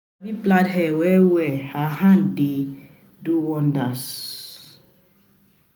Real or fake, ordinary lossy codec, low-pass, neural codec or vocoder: real; none; none; none